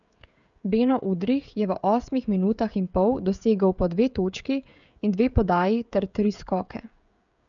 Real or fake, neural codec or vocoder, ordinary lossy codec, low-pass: fake; codec, 16 kHz, 16 kbps, FreqCodec, smaller model; none; 7.2 kHz